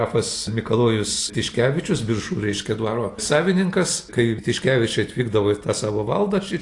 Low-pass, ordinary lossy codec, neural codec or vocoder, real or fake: 10.8 kHz; AAC, 48 kbps; none; real